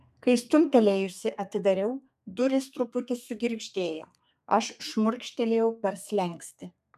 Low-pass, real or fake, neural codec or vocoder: 14.4 kHz; fake; codec, 32 kHz, 1.9 kbps, SNAC